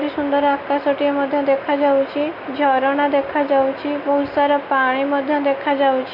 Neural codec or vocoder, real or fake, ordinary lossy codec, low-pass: none; real; none; 5.4 kHz